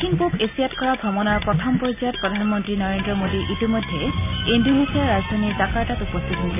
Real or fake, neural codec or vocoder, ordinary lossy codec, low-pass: real; none; none; 3.6 kHz